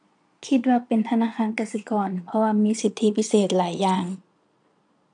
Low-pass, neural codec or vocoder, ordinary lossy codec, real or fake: 9.9 kHz; vocoder, 22.05 kHz, 80 mel bands, Vocos; none; fake